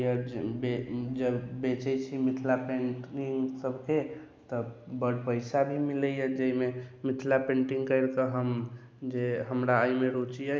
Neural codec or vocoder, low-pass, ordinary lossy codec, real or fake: none; none; none; real